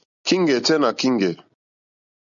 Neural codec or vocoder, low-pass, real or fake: none; 7.2 kHz; real